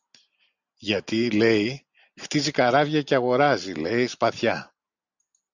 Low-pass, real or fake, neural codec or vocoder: 7.2 kHz; real; none